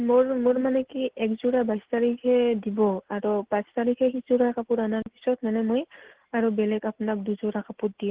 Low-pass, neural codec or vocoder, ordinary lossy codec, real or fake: 3.6 kHz; none; Opus, 16 kbps; real